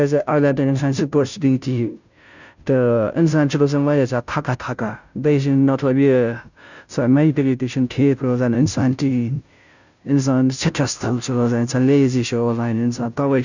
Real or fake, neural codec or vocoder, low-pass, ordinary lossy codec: fake; codec, 16 kHz, 0.5 kbps, FunCodec, trained on Chinese and English, 25 frames a second; 7.2 kHz; none